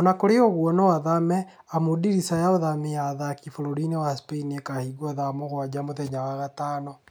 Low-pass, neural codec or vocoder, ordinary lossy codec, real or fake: none; none; none; real